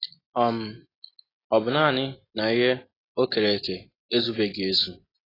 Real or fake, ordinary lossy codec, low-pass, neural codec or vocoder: real; AAC, 24 kbps; 5.4 kHz; none